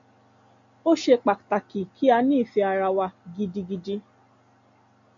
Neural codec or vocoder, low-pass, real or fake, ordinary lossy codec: none; 7.2 kHz; real; MP3, 64 kbps